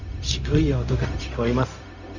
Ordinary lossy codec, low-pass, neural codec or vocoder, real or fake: none; 7.2 kHz; codec, 16 kHz, 0.4 kbps, LongCat-Audio-Codec; fake